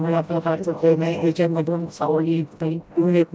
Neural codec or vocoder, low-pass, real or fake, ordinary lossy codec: codec, 16 kHz, 0.5 kbps, FreqCodec, smaller model; none; fake; none